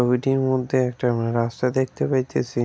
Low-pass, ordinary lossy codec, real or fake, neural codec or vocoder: none; none; real; none